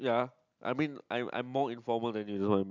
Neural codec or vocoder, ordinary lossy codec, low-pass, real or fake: none; none; 7.2 kHz; real